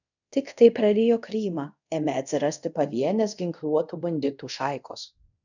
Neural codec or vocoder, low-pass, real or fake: codec, 24 kHz, 0.5 kbps, DualCodec; 7.2 kHz; fake